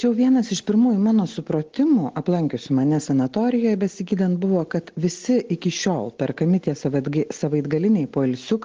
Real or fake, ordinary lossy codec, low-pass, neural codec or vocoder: real; Opus, 16 kbps; 7.2 kHz; none